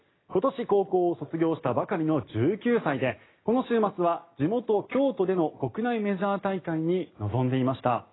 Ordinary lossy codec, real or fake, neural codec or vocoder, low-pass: AAC, 16 kbps; real; none; 7.2 kHz